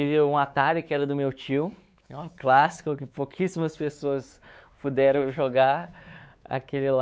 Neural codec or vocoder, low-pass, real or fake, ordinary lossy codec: codec, 16 kHz, 4 kbps, X-Codec, WavLM features, trained on Multilingual LibriSpeech; none; fake; none